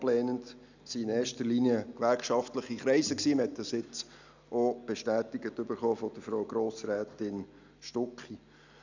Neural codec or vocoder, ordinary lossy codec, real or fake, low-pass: none; none; real; 7.2 kHz